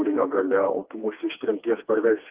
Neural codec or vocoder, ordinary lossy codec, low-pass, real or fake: codec, 16 kHz, 2 kbps, FreqCodec, smaller model; Opus, 24 kbps; 3.6 kHz; fake